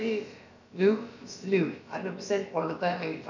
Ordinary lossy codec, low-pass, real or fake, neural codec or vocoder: none; 7.2 kHz; fake; codec, 16 kHz, about 1 kbps, DyCAST, with the encoder's durations